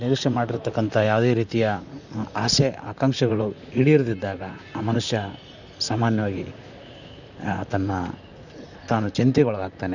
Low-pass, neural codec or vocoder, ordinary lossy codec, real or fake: 7.2 kHz; vocoder, 44.1 kHz, 128 mel bands, Pupu-Vocoder; none; fake